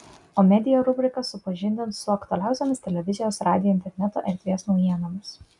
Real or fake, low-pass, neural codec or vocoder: real; 10.8 kHz; none